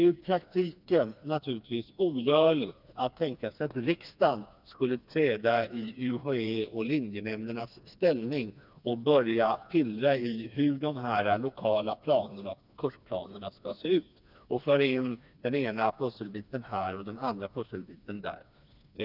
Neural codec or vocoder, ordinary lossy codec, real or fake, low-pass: codec, 16 kHz, 2 kbps, FreqCodec, smaller model; none; fake; 5.4 kHz